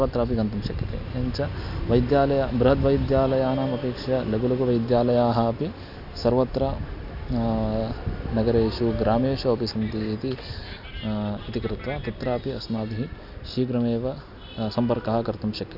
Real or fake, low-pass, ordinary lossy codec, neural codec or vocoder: real; 5.4 kHz; none; none